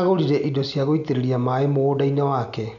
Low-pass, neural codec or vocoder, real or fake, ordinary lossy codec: 7.2 kHz; none; real; none